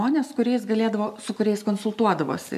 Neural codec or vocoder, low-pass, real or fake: none; 14.4 kHz; real